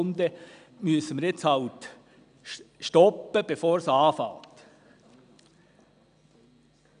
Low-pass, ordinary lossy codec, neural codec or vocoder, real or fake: 9.9 kHz; none; none; real